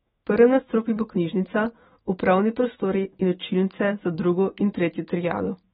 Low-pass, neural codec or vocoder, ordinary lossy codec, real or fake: 19.8 kHz; autoencoder, 48 kHz, 128 numbers a frame, DAC-VAE, trained on Japanese speech; AAC, 16 kbps; fake